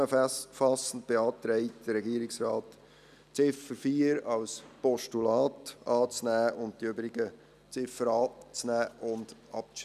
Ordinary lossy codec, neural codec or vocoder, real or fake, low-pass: none; none; real; 14.4 kHz